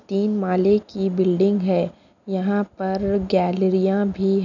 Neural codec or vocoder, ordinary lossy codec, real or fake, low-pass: none; none; real; 7.2 kHz